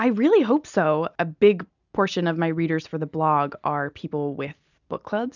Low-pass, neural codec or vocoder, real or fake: 7.2 kHz; none; real